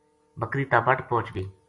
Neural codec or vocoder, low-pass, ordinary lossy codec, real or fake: none; 10.8 kHz; AAC, 64 kbps; real